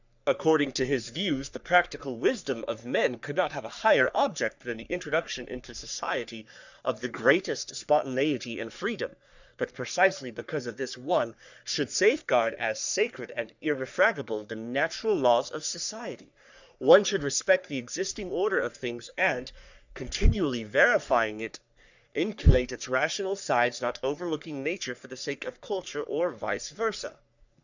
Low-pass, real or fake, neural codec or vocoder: 7.2 kHz; fake; codec, 44.1 kHz, 3.4 kbps, Pupu-Codec